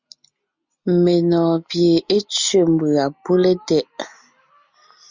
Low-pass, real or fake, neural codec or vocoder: 7.2 kHz; real; none